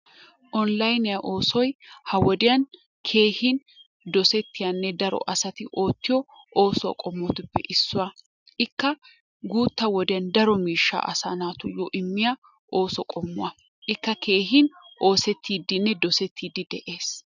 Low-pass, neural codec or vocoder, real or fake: 7.2 kHz; none; real